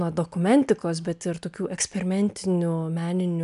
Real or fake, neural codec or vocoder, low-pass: real; none; 10.8 kHz